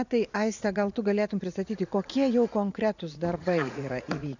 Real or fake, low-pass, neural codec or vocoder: fake; 7.2 kHz; vocoder, 44.1 kHz, 80 mel bands, Vocos